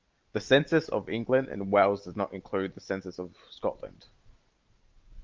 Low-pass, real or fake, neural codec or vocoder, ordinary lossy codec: 7.2 kHz; real; none; Opus, 32 kbps